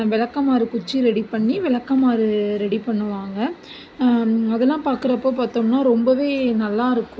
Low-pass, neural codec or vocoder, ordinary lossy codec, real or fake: none; none; none; real